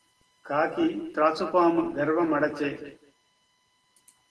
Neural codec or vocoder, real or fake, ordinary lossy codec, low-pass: none; real; Opus, 16 kbps; 10.8 kHz